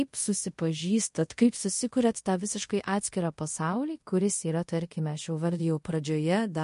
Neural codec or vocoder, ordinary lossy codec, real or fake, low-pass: codec, 24 kHz, 0.5 kbps, DualCodec; MP3, 48 kbps; fake; 10.8 kHz